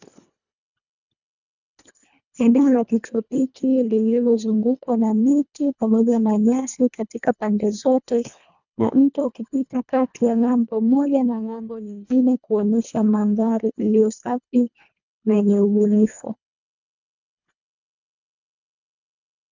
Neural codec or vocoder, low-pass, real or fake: codec, 24 kHz, 1.5 kbps, HILCodec; 7.2 kHz; fake